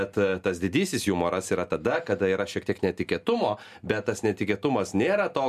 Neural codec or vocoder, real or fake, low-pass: none; real; 14.4 kHz